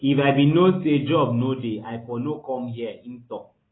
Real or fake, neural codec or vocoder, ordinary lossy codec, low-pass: real; none; AAC, 16 kbps; 7.2 kHz